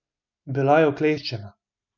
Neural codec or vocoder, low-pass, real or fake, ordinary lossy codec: none; 7.2 kHz; real; none